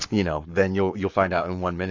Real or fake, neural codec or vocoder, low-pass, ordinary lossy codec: fake; codec, 44.1 kHz, 7.8 kbps, Pupu-Codec; 7.2 kHz; AAC, 48 kbps